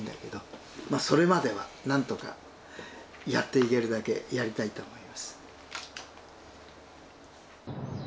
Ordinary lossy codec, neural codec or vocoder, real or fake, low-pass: none; none; real; none